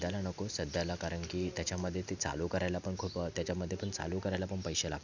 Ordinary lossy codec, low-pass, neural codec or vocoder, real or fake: none; 7.2 kHz; none; real